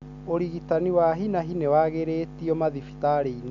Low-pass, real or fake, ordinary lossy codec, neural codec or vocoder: 7.2 kHz; real; none; none